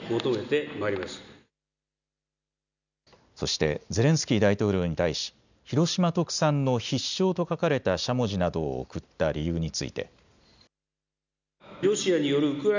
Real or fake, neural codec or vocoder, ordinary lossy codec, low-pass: real; none; none; 7.2 kHz